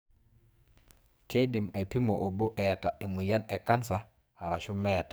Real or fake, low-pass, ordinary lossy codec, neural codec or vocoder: fake; none; none; codec, 44.1 kHz, 2.6 kbps, SNAC